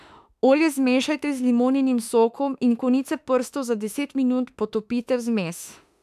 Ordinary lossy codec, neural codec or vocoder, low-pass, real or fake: none; autoencoder, 48 kHz, 32 numbers a frame, DAC-VAE, trained on Japanese speech; 14.4 kHz; fake